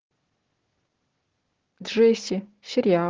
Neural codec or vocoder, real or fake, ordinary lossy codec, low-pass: none; real; Opus, 16 kbps; 7.2 kHz